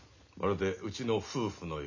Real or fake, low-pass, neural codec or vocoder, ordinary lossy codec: real; 7.2 kHz; none; none